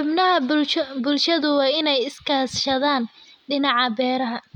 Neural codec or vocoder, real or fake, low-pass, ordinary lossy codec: none; real; 19.8 kHz; MP3, 96 kbps